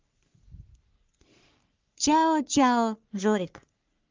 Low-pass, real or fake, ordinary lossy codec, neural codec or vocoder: 7.2 kHz; fake; Opus, 32 kbps; codec, 44.1 kHz, 3.4 kbps, Pupu-Codec